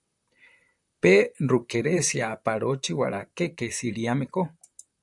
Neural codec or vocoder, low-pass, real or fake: vocoder, 44.1 kHz, 128 mel bands, Pupu-Vocoder; 10.8 kHz; fake